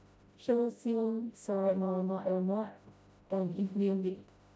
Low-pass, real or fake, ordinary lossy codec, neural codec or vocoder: none; fake; none; codec, 16 kHz, 0.5 kbps, FreqCodec, smaller model